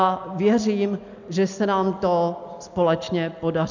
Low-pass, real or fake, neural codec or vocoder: 7.2 kHz; real; none